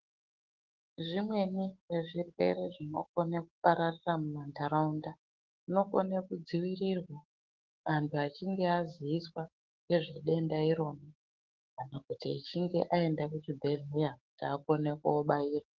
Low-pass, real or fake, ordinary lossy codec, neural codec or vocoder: 7.2 kHz; fake; Opus, 16 kbps; autoencoder, 48 kHz, 128 numbers a frame, DAC-VAE, trained on Japanese speech